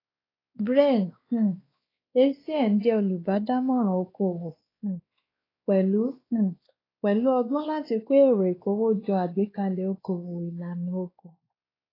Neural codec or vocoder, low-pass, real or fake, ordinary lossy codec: codec, 16 kHz, 2 kbps, X-Codec, WavLM features, trained on Multilingual LibriSpeech; 5.4 kHz; fake; AAC, 24 kbps